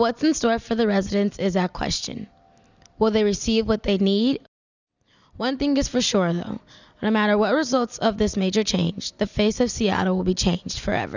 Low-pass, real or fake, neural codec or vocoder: 7.2 kHz; real; none